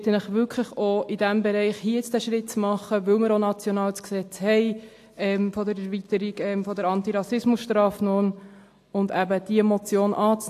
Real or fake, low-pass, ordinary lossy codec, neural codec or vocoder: real; 14.4 kHz; AAC, 64 kbps; none